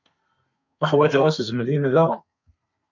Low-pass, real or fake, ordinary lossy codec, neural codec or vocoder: 7.2 kHz; fake; MP3, 64 kbps; codec, 32 kHz, 1.9 kbps, SNAC